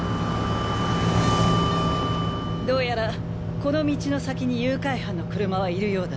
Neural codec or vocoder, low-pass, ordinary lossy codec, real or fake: none; none; none; real